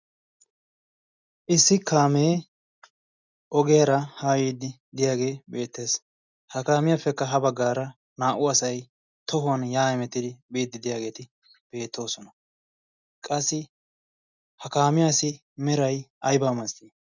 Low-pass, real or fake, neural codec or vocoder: 7.2 kHz; real; none